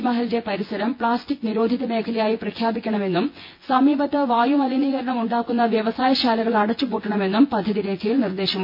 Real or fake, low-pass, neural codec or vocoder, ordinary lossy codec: fake; 5.4 kHz; vocoder, 24 kHz, 100 mel bands, Vocos; MP3, 32 kbps